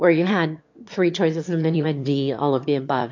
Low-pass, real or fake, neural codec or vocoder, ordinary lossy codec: 7.2 kHz; fake; autoencoder, 22.05 kHz, a latent of 192 numbers a frame, VITS, trained on one speaker; MP3, 48 kbps